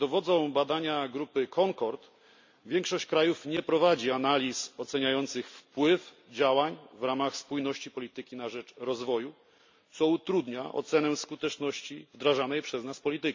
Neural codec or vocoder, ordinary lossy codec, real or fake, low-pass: none; MP3, 64 kbps; real; 7.2 kHz